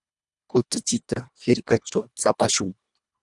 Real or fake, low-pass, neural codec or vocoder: fake; 10.8 kHz; codec, 24 kHz, 1.5 kbps, HILCodec